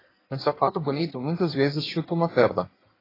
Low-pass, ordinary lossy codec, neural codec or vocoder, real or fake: 5.4 kHz; AAC, 24 kbps; codec, 16 kHz in and 24 kHz out, 1.1 kbps, FireRedTTS-2 codec; fake